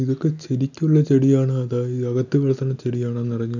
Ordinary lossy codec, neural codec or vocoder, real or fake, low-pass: none; none; real; 7.2 kHz